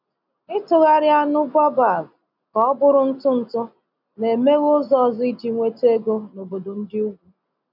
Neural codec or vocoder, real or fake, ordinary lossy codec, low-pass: none; real; none; 5.4 kHz